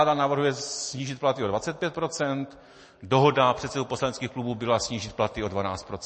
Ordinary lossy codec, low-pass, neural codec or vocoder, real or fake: MP3, 32 kbps; 10.8 kHz; none; real